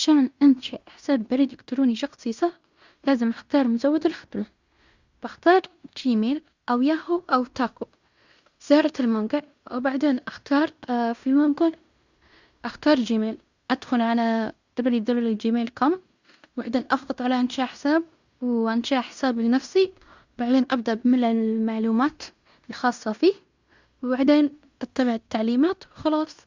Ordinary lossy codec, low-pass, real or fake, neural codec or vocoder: Opus, 64 kbps; 7.2 kHz; fake; codec, 16 kHz in and 24 kHz out, 0.9 kbps, LongCat-Audio-Codec, fine tuned four codebook decoder